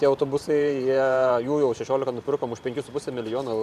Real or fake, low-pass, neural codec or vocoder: fake; 14.4 kHz; vocoder, 44.1 kHz, 128 mel bands every 512 samples, BigVGAN v2